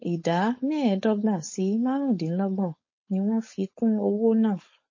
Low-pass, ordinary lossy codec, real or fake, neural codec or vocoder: 7.2 kHz; MP3, 32 kbps; fake; codec, 16 kHz, 4.8 kbps, FACodec